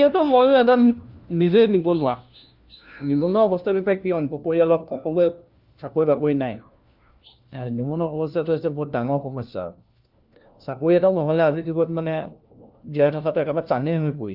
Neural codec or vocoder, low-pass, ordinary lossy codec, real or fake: codec, 16 kHz, 1 kbps, FunCodec, trained on LibriTTS, 50 frames a second; 5.4 kHz; Opus, 24 kbps; fake